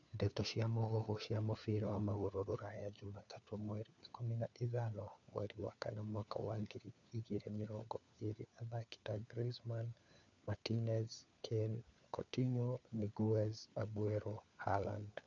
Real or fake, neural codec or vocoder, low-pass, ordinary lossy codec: fake; codec, 16 kHz, 4 kbps, FunCodec, trained on LibriTTS, 50 frames a second; 7.2 kHz; none